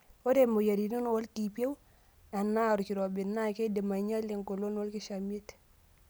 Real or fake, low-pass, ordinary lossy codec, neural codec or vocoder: real; none; none; none